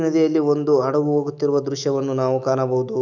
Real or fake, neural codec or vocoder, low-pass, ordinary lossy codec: fake; autoencoder, 48 kHz, 128 numbers a frame, DAC-VAE, trained on Japanese speech; 7.2 kHz; none